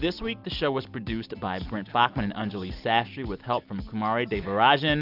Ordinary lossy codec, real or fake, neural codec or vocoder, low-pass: AAC, 48 kbps; real; none; 5.4 kHz